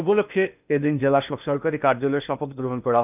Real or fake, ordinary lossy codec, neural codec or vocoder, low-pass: fake; none; codec, 16 kHz in and 24 kHz out, 0.8 kbps, FocalCodec, streaming, 65536 codes; 3.6 kHz